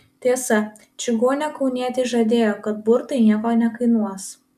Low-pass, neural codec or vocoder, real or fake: 14.4 kHz; none; real